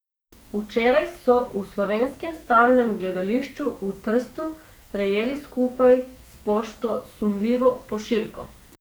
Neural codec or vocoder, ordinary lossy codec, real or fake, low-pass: codec, 44.1 kHz, 2.6 kbps, SNAC; none; fake; none